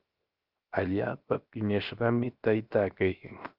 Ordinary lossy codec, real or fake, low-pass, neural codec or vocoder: Opus, 32 kbps; fake; 5.4 kHz; codec, 16 kHz, 0.7 kbps, FocalCodec